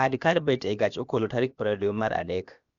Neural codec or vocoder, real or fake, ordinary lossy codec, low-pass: codec, 16 kHz, about 1 kbps, DyCAST, with the encoder's durations; fake; Opus, 64 kbps; 7.2 kHz